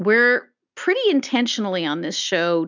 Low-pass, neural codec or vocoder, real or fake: 7.2 kHz; none; real